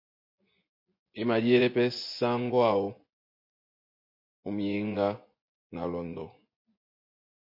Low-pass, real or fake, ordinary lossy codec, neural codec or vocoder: 5.4 kHz; fake; MP3, 32 kbps; vocoder, 24 kHz, 100 mel bands, Vocos